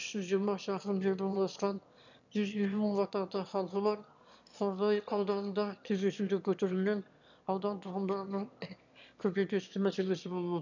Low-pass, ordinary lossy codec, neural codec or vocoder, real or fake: 7.2 kHz; none; autoencoder, 22.05 kHz, a latent of 192 numbers a frame, VITS, trained on one speaker; fake